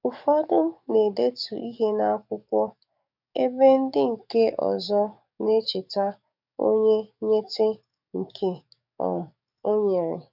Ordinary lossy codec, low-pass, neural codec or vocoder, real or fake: none; 5.4 kHz; codec, 44.1 kHz, 7.8 kbps, DAC; fake